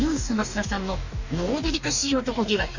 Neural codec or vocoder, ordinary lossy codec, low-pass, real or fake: codec, 44.1 kHz, 2.6 kbps, DAC; none; 7.2 kHz; fake